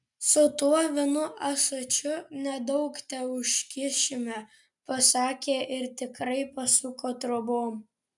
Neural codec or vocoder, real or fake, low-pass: none; real; 10.8 kHz